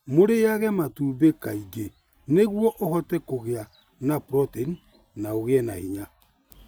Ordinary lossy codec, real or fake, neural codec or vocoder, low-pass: none; real; none; none